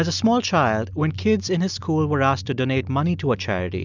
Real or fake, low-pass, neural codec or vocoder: real; 7.2 kHz; none